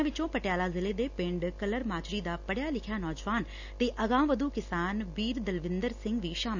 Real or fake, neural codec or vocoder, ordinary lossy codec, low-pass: real; none; none; 7.2 kHz